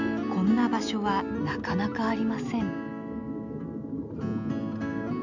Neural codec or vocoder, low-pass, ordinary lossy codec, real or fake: none; 7.2 kHz; none; real